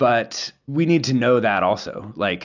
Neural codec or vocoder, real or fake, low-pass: none; real; 7.2 kHz